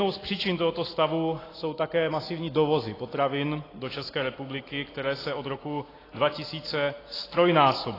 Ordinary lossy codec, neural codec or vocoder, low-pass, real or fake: AAC, 24 kbps; none; 5.4 kHz; real